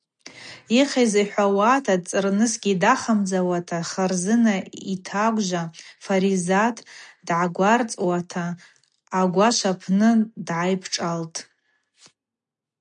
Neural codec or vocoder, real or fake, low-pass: none; real; 9.9 kHz